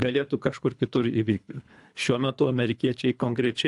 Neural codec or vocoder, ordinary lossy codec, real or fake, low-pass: codec, 24 kHz, 3 kbps, HILCodec; AAC, 64 kbps; fake; 10.8 kHz